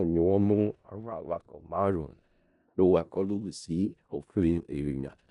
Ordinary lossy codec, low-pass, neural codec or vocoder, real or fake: none; 10.8 kHz; codec, 16 kHz in and 24 kHz out, 0.4 kbps, LongCat-Audio-Codec, four codebook decoder; fake